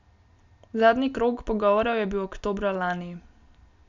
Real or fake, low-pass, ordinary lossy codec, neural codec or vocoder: real; 7.2 kHz; none; none